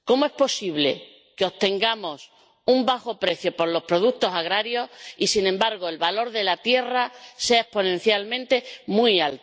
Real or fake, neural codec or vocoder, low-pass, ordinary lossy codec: real; none; none; none